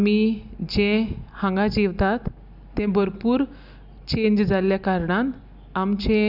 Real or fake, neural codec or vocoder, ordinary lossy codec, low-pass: real; none; none; 5.4 kHz